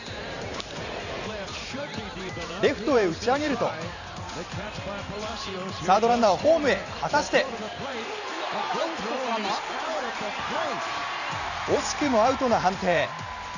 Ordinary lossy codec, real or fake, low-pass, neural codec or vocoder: none; fake; 7.2 kHz; vocoder, 44.1 kHz, 128 mel bands every 512 samples, BigVGAN v2